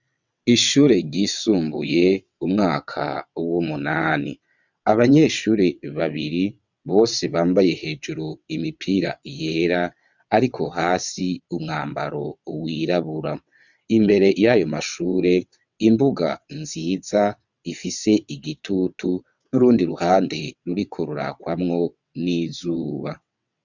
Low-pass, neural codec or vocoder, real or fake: 7.2 kHz; vocoder, 22.05 kHz, 80 mel bands, WaveNeXt; fake